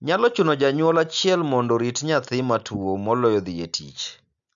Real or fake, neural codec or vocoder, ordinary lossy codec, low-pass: real; none; none; 7.2 kHz